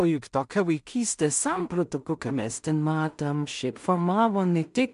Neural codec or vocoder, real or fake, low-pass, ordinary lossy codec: codec, 16 kHz in and 24 kHz out, 0.4 kbps, LongCat-Audio-Codec, two codebook decoder; fake; 10.8 kHz; MP3, 64 kbps